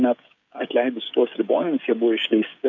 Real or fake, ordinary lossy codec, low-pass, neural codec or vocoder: fake; MP3, 48 kbps; 7.2 kHz; codec, 16 kHz, 16 kbps, FreqCodec, smaller model